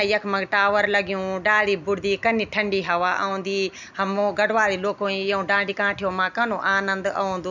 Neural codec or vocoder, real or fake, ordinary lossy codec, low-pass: none; real; none; 7.2 kHz